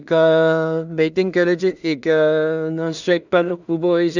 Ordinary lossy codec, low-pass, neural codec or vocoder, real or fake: none; 7.2 kHz; codec, 16 kHz in and 24 kHz out, 0.4 kbps, LongCat-Audio-Codec, two codebook decoder; fake